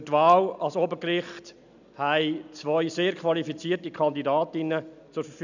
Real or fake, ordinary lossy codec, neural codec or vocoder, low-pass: real; none; none; 7.2 kHz